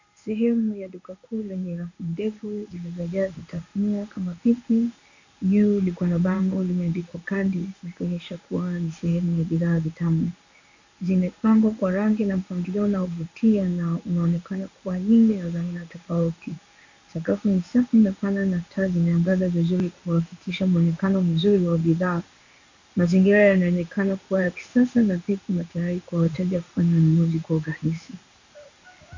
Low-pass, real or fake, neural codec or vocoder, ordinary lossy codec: 7.2 kHz; fake; codec, 16 kHz in and 24 kHz out, 1 kbps, XY-Tokenizer; AAC, 48 kbps